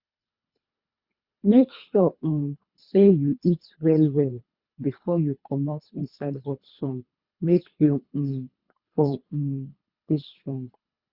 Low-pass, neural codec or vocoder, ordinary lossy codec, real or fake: 5.4 kHz; codec, 24 kHz, 3 kbps, HILCodec; Opus, 64 kbps; fake